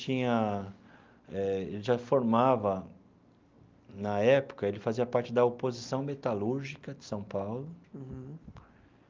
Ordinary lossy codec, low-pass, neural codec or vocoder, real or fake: Opus, 24 kbps; 7.2 kHz; none; real